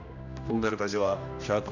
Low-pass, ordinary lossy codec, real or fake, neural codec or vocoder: 7.2 kHz; none; fake; codec, 16 kHz, 1 kbps, X-Codec, HuBERT features, trained on general audio